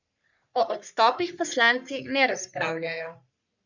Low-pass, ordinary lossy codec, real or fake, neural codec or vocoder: 7.2 kHz; none; fake; codec, 44.1 kHz, 3.4 kbps, Pupu-Codec